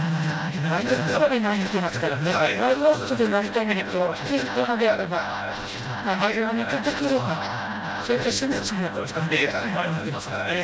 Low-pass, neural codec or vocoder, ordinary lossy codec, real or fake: none; codec, 16 kHz, 0.5 kbps, FreqCodec, smaller model; none; fake